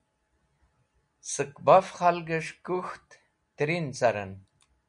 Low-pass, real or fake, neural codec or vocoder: 9.9 kHz; real; none